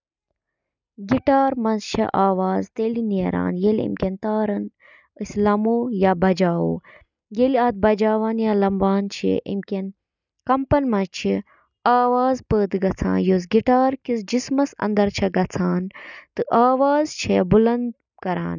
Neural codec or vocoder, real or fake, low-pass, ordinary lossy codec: none; real; 7.2 kHz; none